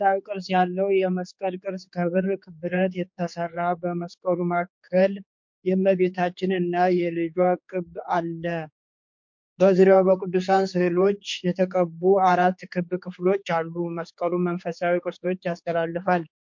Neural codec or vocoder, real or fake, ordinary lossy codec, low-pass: codec, 16 kHz, 4 kbps, X-Codec, HuBERT features, trained on general audio; fake; MP3, 48 kbps; 7.2 kHz